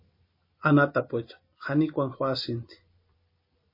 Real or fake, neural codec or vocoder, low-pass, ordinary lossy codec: real; none; 5.4 kHz; MP3, 24 kbps